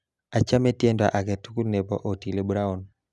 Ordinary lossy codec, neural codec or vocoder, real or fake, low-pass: none; none; real; none